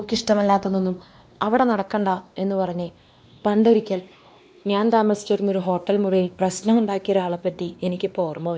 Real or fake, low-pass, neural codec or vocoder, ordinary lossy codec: fake; none; codec, 16 kHz, 2 kbps, X-Codec, WavLM features, trained on Multilingual LibriSpeech; none